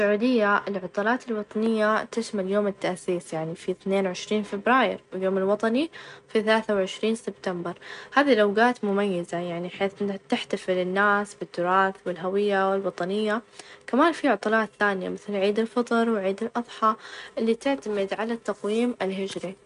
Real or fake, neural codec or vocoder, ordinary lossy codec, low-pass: real; none; none; 10.8 kHz